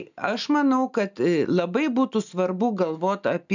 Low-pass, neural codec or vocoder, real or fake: 7.2 kHz; none; real